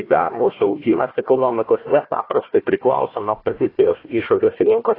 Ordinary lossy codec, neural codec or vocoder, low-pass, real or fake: AAC, 24 kbps; codec, 16 kHz, 1 kbps, FunCodec, trained on Chinese and English, 50 frames a second; 5.4 kHz; fake